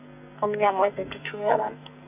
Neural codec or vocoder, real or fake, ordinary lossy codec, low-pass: codec, 44.1 kHz, 2.6 kbps, SNAC; fake; none; 3.6 kHz